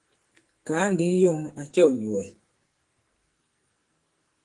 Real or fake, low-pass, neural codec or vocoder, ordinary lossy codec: fake; 10.8 kHz; codec, 44.1 kHz, 2.6 kbps, SNAC; Opus, 24 kbps